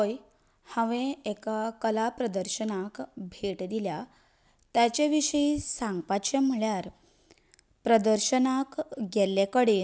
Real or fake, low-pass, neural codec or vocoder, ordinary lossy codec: real; none; none; none